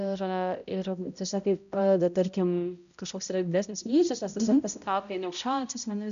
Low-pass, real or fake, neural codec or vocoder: 7.2 kHz; fake; codec, 16 kHz, 0.5 kbps, X-Codec, HuBERT features, trained on balanced general audio